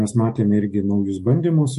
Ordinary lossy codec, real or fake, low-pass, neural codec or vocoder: MP3, 48 kbps; fake; 14.4 kHz; autoencoder, 48 kHz, 128 numbers a frame, DAC-VAE, trained on Japanese speech